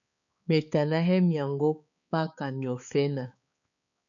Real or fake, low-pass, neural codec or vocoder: fake; 7.2 kHz; codec, 16 kHz, 4 kbps, X-Codec, HuBERT features, trained on balanced general audio